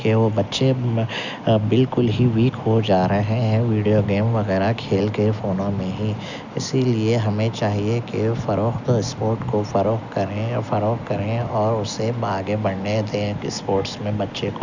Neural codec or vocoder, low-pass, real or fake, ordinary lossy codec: none; 7.2 kHz; real; none